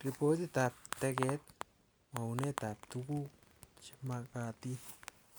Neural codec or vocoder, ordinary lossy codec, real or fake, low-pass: none; none; real; none